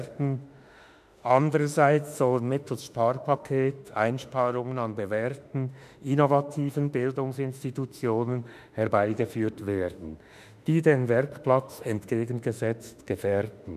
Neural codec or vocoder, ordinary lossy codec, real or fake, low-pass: autoencoder, 48 kHz, 32 numbers a frame, DAC-VAE, trained on Japanese speech; none; fake; 14.4 kHz